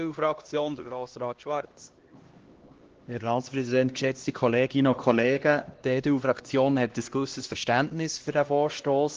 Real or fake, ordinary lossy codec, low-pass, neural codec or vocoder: fake; Opus, 16 kbps; 7.2 kHz; codec, 16 kHz, 1 kbps, X-Codec, HuBERT features, trained on LibriSpeech